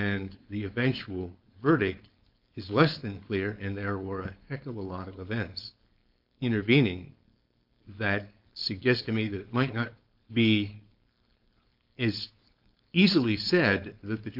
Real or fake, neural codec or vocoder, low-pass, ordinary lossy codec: fake; codec, 16 kHz, 4.8 kbps, FACodec; 5.4 kHz; AAC, 48 kbps